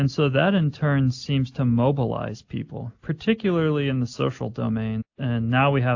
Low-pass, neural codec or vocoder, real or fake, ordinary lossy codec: 7.2 kHz; none; real; AAC, 48 kbps